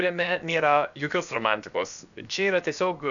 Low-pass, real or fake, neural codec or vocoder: 7.2 kHz; fake; codec, 16 kHz, about 1 kbps, DyCAST, with the encoder's durations